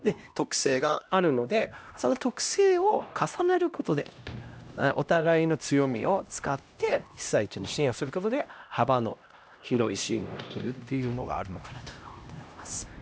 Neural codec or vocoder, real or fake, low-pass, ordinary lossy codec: codec, 16 kHz, 1 kbps, X-Codec, HuBERT features, trained on LibriSpeech; fake; none; none